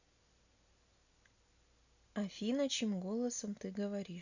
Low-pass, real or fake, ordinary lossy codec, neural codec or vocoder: 7.2 kHz; real; none; none